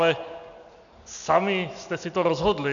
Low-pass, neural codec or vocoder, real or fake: 7.2 kHz; none; real